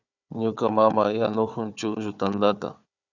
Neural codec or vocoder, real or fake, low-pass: codec, 16 kHz, 4 kbps, FunCodec, trained on Chinese and English, 50 frames a second; fake; 7.2 kHz